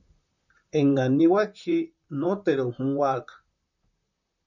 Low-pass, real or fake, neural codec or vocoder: 7.2 kHz; fake; vocoder, 44.1 kHz, 128 mel bands, Pupu-Vocoder